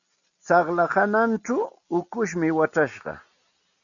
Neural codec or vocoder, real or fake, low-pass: none; real; 7.2 kHz